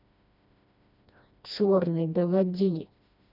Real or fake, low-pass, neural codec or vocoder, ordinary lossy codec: fake; 5.4 kHz; codec, 16 kHz, 1 kbps, FreqCodec, smaller model; none